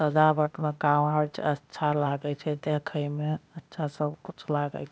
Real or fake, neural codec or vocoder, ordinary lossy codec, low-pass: fake; codec, 16 kHz, 0.8 kbps, ZipCodec; none; none